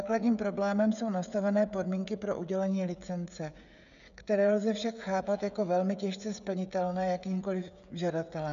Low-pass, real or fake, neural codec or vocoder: 7.2 kHz; fake; codec, 16 kHz, 16 kbps, FreqCodec, smaller model